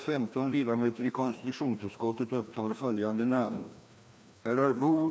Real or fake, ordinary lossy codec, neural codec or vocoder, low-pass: fake; none; codec, 16 kHz, 1 kbps, FreqCodec, larger model; none